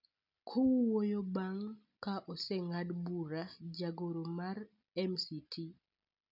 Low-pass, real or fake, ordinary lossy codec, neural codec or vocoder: 5.4 kHz; real; none; none